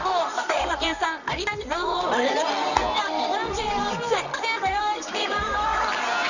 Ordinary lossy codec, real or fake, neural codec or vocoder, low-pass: none; fake; codec, 24 kHz, 0.9 kbps, WavTokenizer, medium music audio release; 7.2 kHz